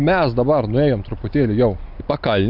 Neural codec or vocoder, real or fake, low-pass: none; real; 5.4 kHz